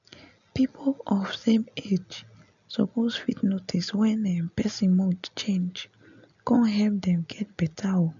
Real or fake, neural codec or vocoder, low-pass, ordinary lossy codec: real; none; 7.2 kHz; none